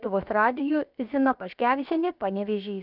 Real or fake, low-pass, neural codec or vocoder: fake; 5.4 kHz; codec, 16 kHz, about 1 kbps, DyCAST, with the encoder's durations